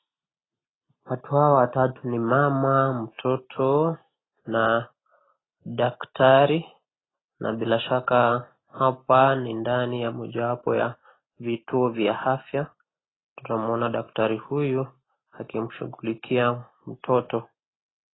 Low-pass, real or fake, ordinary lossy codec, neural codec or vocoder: 7.2 kHz; real; AAC, 16 kbps; none